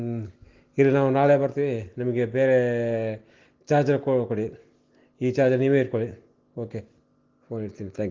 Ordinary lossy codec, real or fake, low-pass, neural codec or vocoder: Opus, 16 kbps; real; 7.2 kHz; none